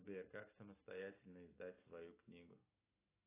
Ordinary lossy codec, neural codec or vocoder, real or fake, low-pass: AAC, 24 kbps; none; real; 3.6 kHz